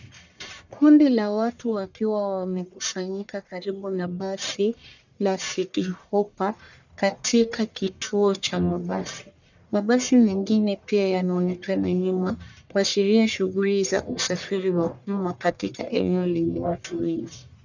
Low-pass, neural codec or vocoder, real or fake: 7.2 kHz; codec, 44.1 kHz, 1.7 kbps, Pupu-Codec; fake